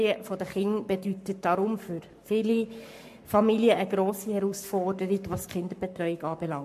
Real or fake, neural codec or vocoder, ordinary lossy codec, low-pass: fake; codec, 44.1 kHz, 7.8 kbps, Pupu-Codec; MP3, 64 kbps; 14.4 kHz